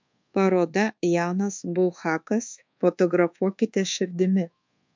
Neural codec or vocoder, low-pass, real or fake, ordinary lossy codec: codec, 24 kHz, 1.2 kbps, DualCodec; 7.2 kHz; fake; MP3, 64 kbps